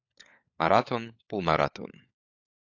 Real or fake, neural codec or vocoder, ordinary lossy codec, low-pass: fake; codec, 16 kHz, 4 kbps, FunCodec, trained on LibriTTS, 50 frames a second; AAC, 48 kbps; 7.2 kHz